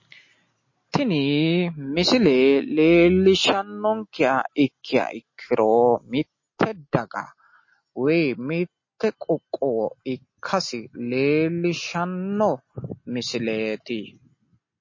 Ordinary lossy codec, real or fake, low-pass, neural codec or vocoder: MP3, 32 kbps; real; 7.2 kHz; none